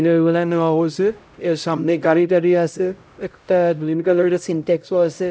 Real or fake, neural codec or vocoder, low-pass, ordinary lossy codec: fake; codec, 16 kHz, 0.5 kbps, X-Codec, HuBERT features, trained on LibriSpeech; none; none